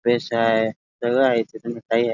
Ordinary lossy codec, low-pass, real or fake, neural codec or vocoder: none; 7.2 kHz; real; none